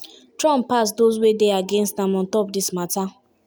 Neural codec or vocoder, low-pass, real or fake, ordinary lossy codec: none; none; real; none